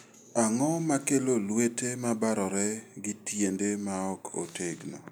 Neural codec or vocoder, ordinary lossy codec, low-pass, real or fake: none; none; none; real